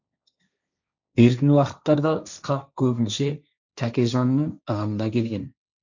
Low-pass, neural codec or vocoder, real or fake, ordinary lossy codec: 7.2 kHz; codec, 16 kHz, 1.1 kbps, Voila-Tokenizer; fake; none